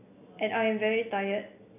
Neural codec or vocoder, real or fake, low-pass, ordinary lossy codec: none; real; 3.6 kHz; none